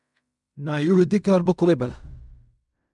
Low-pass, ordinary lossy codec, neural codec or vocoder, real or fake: 10.8 kHz; MP3, 96 kbps; codec, 16 kHz in and 24 kHz out, 0.4 kbps, LongCat-Audio-Codec, fine tuned four codebook decoder; fake